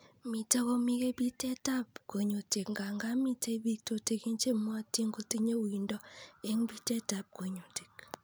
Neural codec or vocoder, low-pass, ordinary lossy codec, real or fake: none; none; none; real